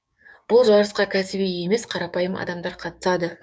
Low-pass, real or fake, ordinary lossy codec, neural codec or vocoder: none; fake; none; codec, 16 kHz, 6 kbps, DAC